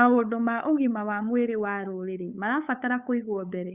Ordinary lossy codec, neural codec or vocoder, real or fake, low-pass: Opus, 64 kbps; codec, 16 kHz, 8 kbps, FunCodec, trained on LibriTTS, 25 frames a second; fake; 3.6 kHz